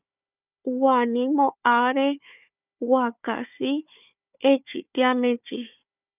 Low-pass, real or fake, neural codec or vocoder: 3.6 kHz; fake; codec, 16 kHz, 4 kbps, FunCodec, trained on Chinese and English, 50 frames a second